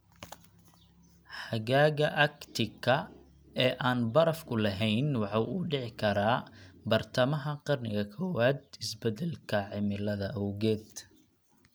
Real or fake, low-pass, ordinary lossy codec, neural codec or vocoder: real; none; none; none